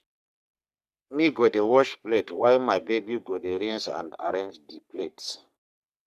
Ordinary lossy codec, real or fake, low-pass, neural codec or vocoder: none; fake; 14.4 kHz; codec, 44.1 kHz, 3.4 kbps, Pupu-Codec